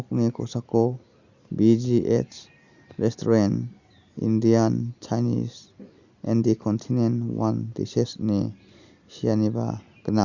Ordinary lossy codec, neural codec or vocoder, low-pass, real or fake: Opus, 64 kbps; none; 7.2 kHz; real